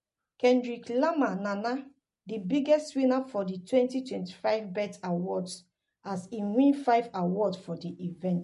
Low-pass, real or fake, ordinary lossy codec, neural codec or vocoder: 14.4 kHz; real; MP3, 48 kbps; none